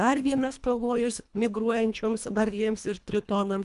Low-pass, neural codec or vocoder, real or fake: 10.8 kHz; codec, 24 kHz, 1.5 kbps, HILCodec; fake